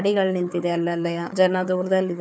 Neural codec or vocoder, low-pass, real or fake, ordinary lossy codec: codec, 16 kHz, 4 kbps, FunCodec, trained on Chinese and English, 50 frames a second; none; fake; none